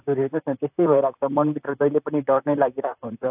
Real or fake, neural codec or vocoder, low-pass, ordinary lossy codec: fake; vocoder, 44.1 kHz, 128 mel bands, Pupu-Vocoder; 3.6 kHz; AAC, 32 kbps